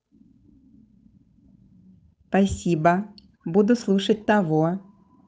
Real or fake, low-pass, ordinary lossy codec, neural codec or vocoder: fake; none; none; codec, 16 kHz, 8 kbps, FunCodec, trained on Chinese and English, 25 frames a second